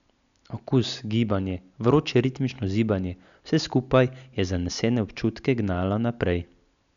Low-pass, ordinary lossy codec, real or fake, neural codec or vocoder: 7.2 kHz; none; real; none